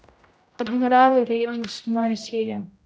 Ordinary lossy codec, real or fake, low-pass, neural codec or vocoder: none; fake; none; codec, 16 kHz, 0.5 kbps, X-Codec, HuBERT features, trained on general audio